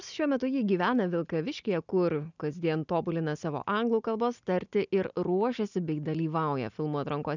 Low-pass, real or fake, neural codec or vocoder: 7.2 kHz; real; none